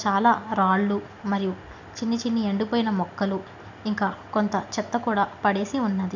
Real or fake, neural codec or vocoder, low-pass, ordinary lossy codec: real; none; 7.2 kHz; none